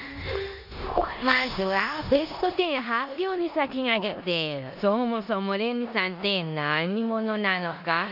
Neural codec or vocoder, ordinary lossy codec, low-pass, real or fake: codec, 16 kHz in and 24 kHz out, 0.9 kbps, LongCat-Audio-Codec, four codebook decoder; none; 5.4 kHz; fake